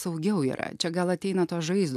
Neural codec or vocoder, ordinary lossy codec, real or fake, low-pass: none; MP3, 96 kbps; real; 14.4 kHz